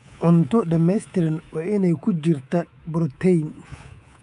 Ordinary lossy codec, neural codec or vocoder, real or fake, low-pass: none; codec, 24 kHz, 3.1 kbps, DualCodec; fake; 10.8 kHz